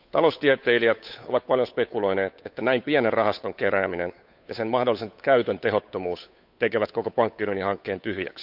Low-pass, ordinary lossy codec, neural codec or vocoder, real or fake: 5.4 kHz; none; codec, 16 kHz, 8 kbps, FunCodec, trained on Chinese and English, 25 frames a second; fake